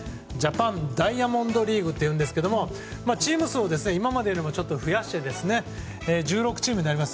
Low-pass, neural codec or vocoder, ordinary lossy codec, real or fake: none; none; none; real